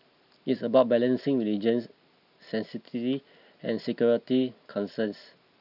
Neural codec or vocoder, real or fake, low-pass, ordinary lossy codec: none; real; 5.4 kHz; none